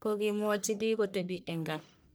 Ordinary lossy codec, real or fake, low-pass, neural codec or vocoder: none; fake; none; codec, 44.1 kHz, 1.7 kbps, Pupu-Codec